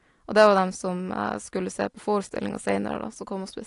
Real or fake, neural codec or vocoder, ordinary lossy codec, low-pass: real; none; AAC, 48 kbps; 10.8 kHz